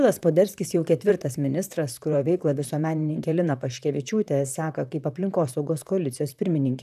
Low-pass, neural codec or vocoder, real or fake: 14.4 kHz; vocoder, 44.1 kHz, 128 mel bands, Pupu-Vocoder; fake